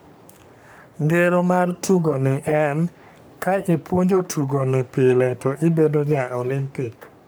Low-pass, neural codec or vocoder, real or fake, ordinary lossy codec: none; codec, 44.1 kHz, 3.4 kbps, Pupu-Codec; fake; none